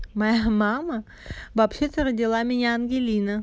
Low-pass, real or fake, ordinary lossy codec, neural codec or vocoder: none; real; none; none